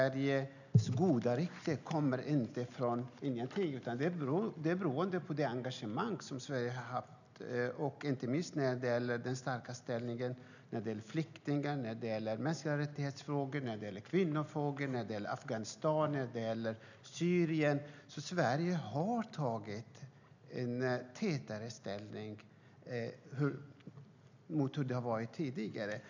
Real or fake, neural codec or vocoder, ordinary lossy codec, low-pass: real; none; none; 7.2 kHz